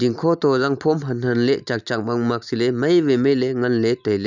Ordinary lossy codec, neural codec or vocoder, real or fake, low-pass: none; none; real; 7.2 kHz